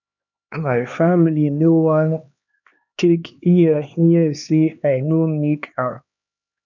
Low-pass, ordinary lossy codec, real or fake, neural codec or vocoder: 7.2 kHz; none; fake; codec, 16 kHz, 2 kbps, X-Codec, HuBERT features, trained on LibriSpeech